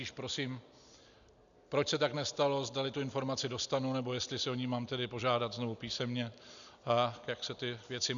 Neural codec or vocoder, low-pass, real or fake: none; 7.2 kHz; real